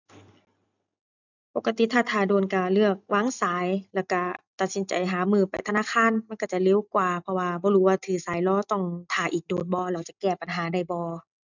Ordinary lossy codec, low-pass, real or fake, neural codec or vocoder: none; 7.2 kHz; real; none